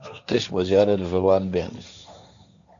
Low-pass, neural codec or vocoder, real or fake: 7.2 kHz; codec, 16 kHz, 1.1 kbps, Voila-Tokenizer; fake